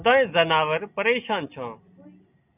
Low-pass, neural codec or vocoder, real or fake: 3.6 kHz; none; real